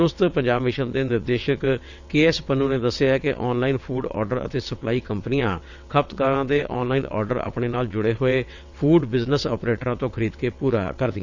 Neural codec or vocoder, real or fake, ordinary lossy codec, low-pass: vocoder, 22.05 kHz, 80 mel bands, WaveNeXt; fake; none; 7.2 kHz